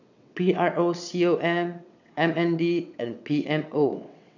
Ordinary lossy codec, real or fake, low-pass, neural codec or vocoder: none; fake; 7.2 kHz; vocoder, 22.05 kHz, 80 mel bands, WaveNeXt